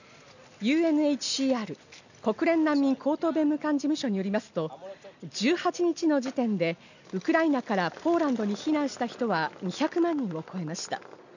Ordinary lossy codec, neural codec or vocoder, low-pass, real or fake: none; none; 7.2 kHz; real